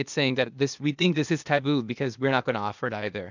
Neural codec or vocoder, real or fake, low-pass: codec, 16 kHz, 0.8 kbps, ZipCodec; fake; 7.2 kHz